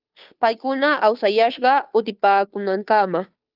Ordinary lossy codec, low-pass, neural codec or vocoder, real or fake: Opus, 24 kbps; 5.4 kHz; codec, 16 kHz, 2 kbps, FunCodec, trained on Chinese and English, 25 frames a second; fake